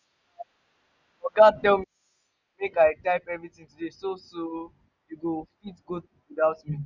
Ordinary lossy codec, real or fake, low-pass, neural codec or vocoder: none; real; 7.2 kHz; none